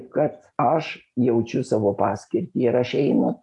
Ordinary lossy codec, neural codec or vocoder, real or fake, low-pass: AAC, 64 kbps; none; real; 10.8 kHz